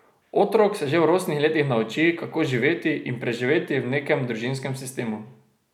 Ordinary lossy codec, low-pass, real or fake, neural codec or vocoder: none; 19.8 kHz; real; none